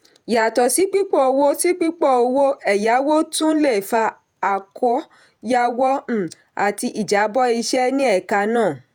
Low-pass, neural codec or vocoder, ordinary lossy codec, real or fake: none; vocoder, 48 kHz, 128 mel bands, Vocos; none; fake